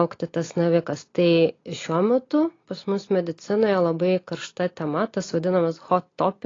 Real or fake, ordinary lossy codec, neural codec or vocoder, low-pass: real; AAC, 32 kbps; none; 7.2 kHz